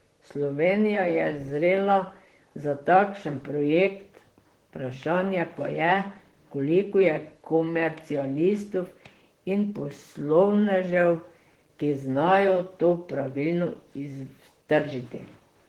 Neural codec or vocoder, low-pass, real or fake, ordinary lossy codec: vocoder, 44.1 kHz, 128 mel bands, Pupu-Vocoder; 19.8 kHz; fake; Opus, 16 kbps